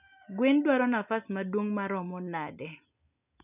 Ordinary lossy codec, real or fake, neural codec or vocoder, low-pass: none; real; none; 3.6 kHz